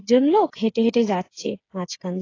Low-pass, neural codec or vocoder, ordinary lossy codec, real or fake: 7.2 kHz; codec, 16 kHz, 8 kbps, FreqCodec, smaller model; AAC, 32 kbps; fake